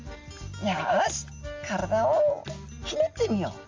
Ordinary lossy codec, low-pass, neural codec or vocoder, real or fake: Opus, 32 kbps; 7.2 kHz; none; real